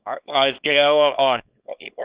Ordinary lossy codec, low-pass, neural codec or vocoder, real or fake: Opus, 64 kbps; 3.6 kHz; codec, 24 kHz, 0.9 kbps, WavTokenizer, small release; fake